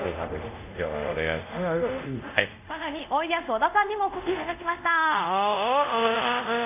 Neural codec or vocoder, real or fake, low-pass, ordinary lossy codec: codec, 24 kHz, 0.5 kbps, DualCodec; fake; 3.6 kHz; none